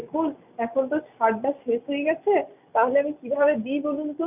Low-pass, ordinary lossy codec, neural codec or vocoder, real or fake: 3.6 kHz; none; none; real